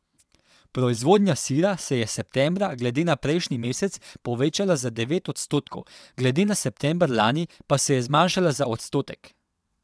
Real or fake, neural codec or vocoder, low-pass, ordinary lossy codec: fake; vocoder, 22.05 kHz, 80 mel bands, WaveNeXt; none; none